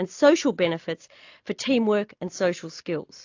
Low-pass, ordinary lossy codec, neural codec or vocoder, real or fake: 7.2 kHz; AAC, 48 kbps; none; real